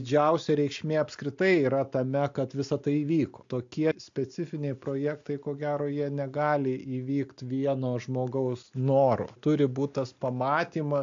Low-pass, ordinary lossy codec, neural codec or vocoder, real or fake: 7.2 kHz; AAC, 64 kbps; none; real